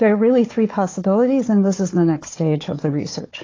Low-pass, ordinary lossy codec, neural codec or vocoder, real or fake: 7.2 kHz; AAC, 32 kbps; codec, 16 kHz, 4 kbps, FunCodec, trained on Chinese and English, 50 frames a second; fake